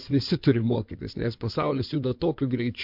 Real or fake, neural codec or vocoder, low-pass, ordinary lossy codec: fake; codec, 16 kHz in and 24 kHz out, 2.2 kbps, FireRedTTS-2 codec; 5.4 kHz; MP3, 48 kbps